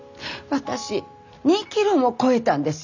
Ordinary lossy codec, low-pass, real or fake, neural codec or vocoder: none; 7.2 kHz; real; none